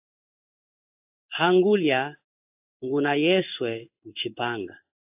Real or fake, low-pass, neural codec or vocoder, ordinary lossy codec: fake; 3.6 kHz; codec, 16 kHz in and 24 kHz out, 1 kbps, XY-Tokenizer; AAC, 32 kbps